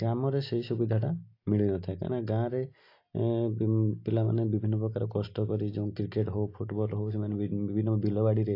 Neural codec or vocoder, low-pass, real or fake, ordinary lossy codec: none; 5.4 kHz; real; AAC, 32 kbps